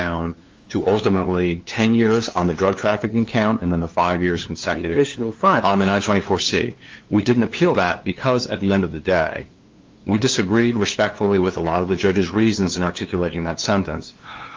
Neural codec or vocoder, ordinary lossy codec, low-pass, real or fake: codec, 16 kHz, 2 kbps, FunCodec, trained on LibriTTS, 25 frames a second; Opus, 32 kbps; 7.2 kHz; fake